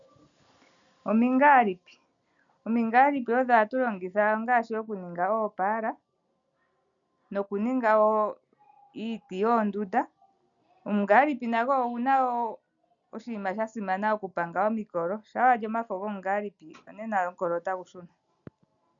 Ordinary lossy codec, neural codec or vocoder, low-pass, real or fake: MP3, 96 kbps; none; 7.2 kHz; real